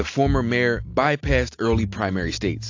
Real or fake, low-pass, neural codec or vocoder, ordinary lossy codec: real; 7.2 kHz; none; AAC, 48 kbps